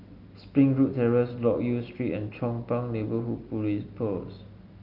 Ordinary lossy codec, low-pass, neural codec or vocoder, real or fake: Opus, 32 kbps; 5.4 kHz; none; real